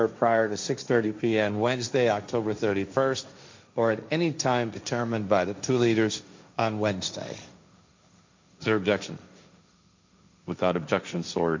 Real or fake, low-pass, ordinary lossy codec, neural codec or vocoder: fake; 7.2 kHz; MP3, 64 kbps; codec, 16 kHz, 1.1 kbps, Voila-Tokenizer